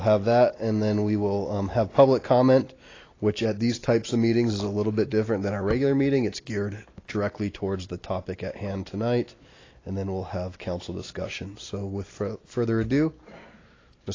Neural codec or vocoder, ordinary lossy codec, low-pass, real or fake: none; AAC, 32 kbps; 7.2 kHz; real